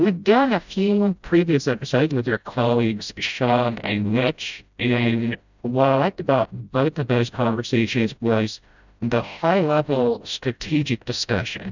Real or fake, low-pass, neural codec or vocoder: fake; 7.2 kHz; codec, 16 kHz, 0.5 kbps, FreqCodec, smaller model